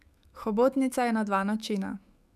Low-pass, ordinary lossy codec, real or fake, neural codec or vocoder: 14.4 kHz; none; fake; autoencoder, 48 kHz, 128 numbers a frame, DAC-VAE, trained on Japanese speech